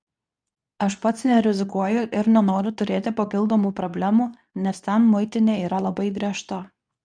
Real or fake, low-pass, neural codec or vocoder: fake; 9.9 kHz; codec, 24 kHz, 0.9 kbps, WavTokenizer, medium speech release version 2